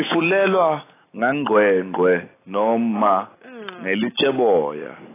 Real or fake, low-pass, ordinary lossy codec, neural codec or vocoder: real; 3.6 kHz; AAC, 16 kbps; none